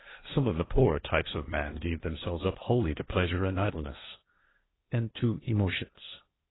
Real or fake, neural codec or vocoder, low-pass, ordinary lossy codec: fake; codec, 16 kHz, 1.1 kbps, Voila-Tokenizer; 7.2 kHz; AAC, 16 kbps